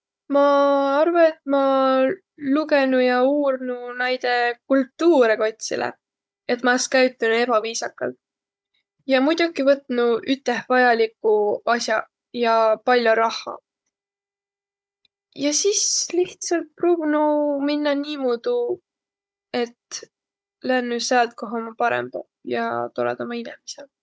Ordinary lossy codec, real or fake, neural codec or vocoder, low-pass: none; fake; codec, 16 kHz, 16 kbps, FunCodec, trained on Chinese and English, 50 frames a second; none